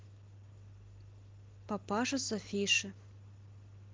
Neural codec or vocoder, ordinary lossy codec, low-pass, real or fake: none; Opus, 16 kbps; 7.2 kHz; real